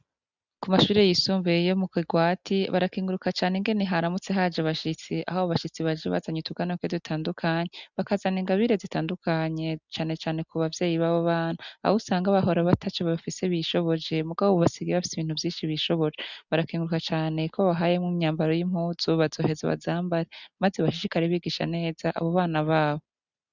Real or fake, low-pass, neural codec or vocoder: real; 7.2 kHz; none